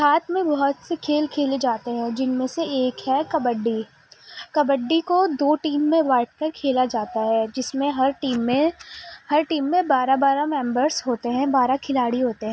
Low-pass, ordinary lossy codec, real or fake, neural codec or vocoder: none; none; real; none